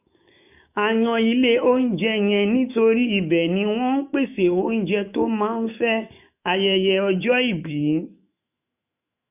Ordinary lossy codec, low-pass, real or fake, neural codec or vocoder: none; 3.6 kHz; fake; autoencoder, 48 kHz, 128 numbers a frame, DAC-VAE, trained on Japanese speech